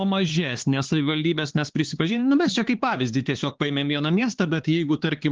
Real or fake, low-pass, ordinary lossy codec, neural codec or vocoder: fake; 7.2 kHz; Opus, 16 kbps; codec, 16 kHz, 4 kbps, X-Codec, HuBERT features, trained on LibriSpeech